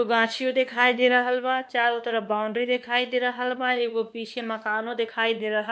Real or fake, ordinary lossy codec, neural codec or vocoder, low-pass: fake; none; codec, 16 kHz, 2 kbps, X-Codec, WavLM features, trained on Multilingual LibriSpeech; none